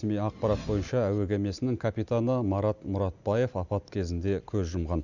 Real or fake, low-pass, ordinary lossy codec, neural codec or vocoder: real; 7.2 kHz; none; none